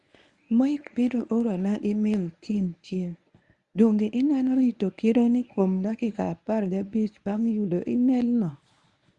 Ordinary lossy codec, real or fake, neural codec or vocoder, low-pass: Opus, 64 kbps; fake; codec, 24 kHz, 0.9 kbps, WavTokenizer, medium speech release version 1; 10.8 kHz